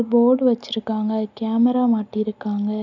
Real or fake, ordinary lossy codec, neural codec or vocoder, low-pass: real; none; none; 7.2 kHz